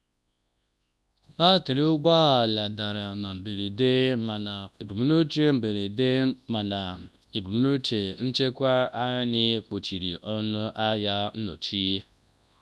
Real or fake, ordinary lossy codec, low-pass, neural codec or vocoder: fake; none; none; codec, 24 kHz, 0.9 kbps, WavTokenizer, large speech release